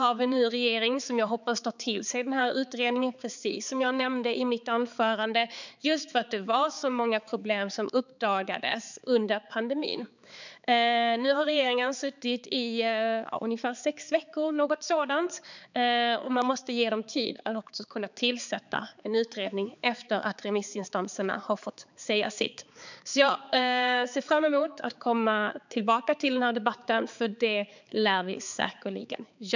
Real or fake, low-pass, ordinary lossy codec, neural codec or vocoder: fake; 7.2 kHz; none; codec, 16 kHz, 4 kbps, X-Codec, HuBERT features, trained on balanced general audio